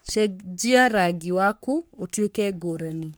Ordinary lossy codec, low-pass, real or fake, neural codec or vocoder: none; none; fake; codec, 44.1 kHz, 3.4 kbps, Pupu-Codec